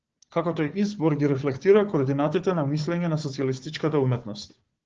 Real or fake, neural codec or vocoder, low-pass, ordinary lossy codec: fake; codec, 16 kHz, 4 kbps, FunCodec, trained on Chinese and English, 50 frames a second; 7.2 kHz; Opus, 16 kbps